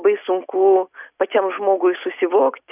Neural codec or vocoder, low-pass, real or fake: none; 3.6 kHz; real